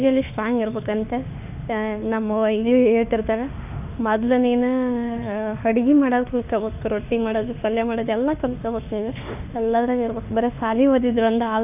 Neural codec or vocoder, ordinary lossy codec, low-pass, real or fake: autoencoder, 48 kHz, 32 numbers a frame, DAC-VAE, trained on Japanese speech; none; 3.6 kHz; fake